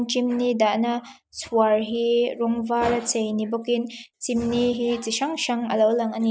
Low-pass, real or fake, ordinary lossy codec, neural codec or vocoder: none; real; none; none